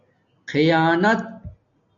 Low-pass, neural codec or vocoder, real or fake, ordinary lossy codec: 7.2 kHz; none; real; AAC, 64 kbps